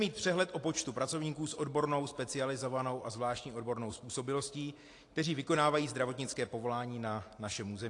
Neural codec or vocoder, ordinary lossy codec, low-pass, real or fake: none; AAC, 48 kbps; 10.8 kHz; real